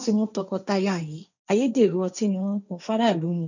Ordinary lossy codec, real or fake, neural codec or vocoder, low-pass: none; fake; codec, 16 kHz, 1.1 kbps, Voila-Tokenizer; 7.2 kHz